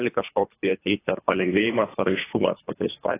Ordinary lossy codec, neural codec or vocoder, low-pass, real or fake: AAC, 16 kbps; codec, 24 kHz, 3 kbps, HILCodec; 3.6 kHz; fake